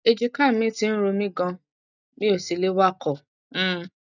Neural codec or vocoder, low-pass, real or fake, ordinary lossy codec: none; 7.2 kHz; real; none